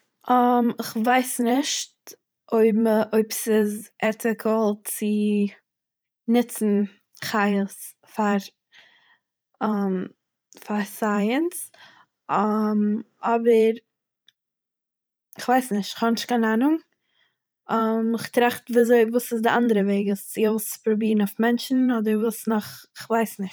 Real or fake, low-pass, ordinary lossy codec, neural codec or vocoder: fake; none; none; vocoder, 44.1 kHz, 128 mel bands every 512 samples, BigVGAN v2